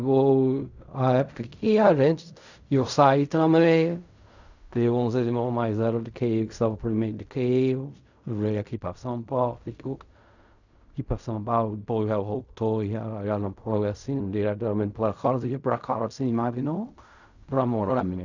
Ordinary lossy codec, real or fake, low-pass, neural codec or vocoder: none; fake; 7.2 kHz; codec, 16 kHz in and 24 kHz out, 0.4 kbps, LongCat-Audio-Codec, fine tuned four codebook decoder